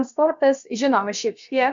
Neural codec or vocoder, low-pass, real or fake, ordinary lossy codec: codec, 16 kHz, about 1 kbps, DyCAST, with the encoder's durations; 7.2 kHz; fake; Opus, 64 kbps